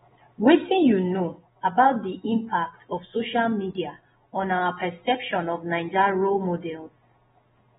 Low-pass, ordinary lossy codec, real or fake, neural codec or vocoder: 7.2 kHz; AAC, 16 kbps; real; none